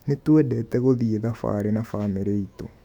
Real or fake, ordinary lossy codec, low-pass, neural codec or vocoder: fake; none; 19.8 kHz; autoencoder, 48 kHz, 128 numbers a frame, DAC-VAE, trained on Japanese speech